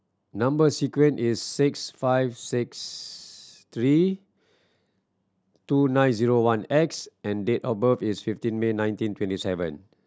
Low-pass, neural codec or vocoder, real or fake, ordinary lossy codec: none; none; real; none